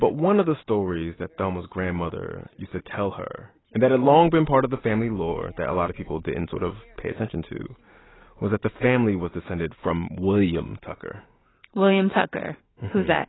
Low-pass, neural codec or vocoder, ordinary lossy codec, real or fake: 7.2 kHz; none; AAC, 16 kbps; real